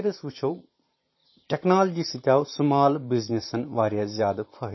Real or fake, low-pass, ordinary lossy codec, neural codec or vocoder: fake; 7.2 kHz; MP3, 24 kbps; vocoder, 44.1 kHz, 128 mel bands every 512 samples, BigVGAN v2